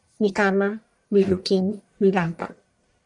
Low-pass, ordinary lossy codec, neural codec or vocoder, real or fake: 10.8 kHz; MP3, 96 kbps; codec, 44.1 kHz, 1.7 kbps, Pupu-Codec; fake